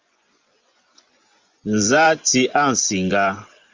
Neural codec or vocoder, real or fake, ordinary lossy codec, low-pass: vocoder, 24 kHz, 100 mel bands, Vocos; fake; Opus, 32 kbps; 7.2 kHz